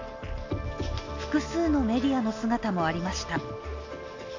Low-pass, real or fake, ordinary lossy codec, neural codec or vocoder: 7.2 kHz; real; AAC, 48 kbps; none